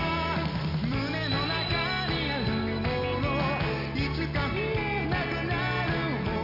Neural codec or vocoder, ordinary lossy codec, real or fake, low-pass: none; none; real; 5.4 kHz